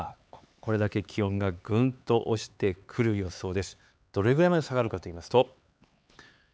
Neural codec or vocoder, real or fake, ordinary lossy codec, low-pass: codec, 16 kHz, 4 kbps, X-Codec, HuBERT features, trained on LibriSpeech; fake; none; none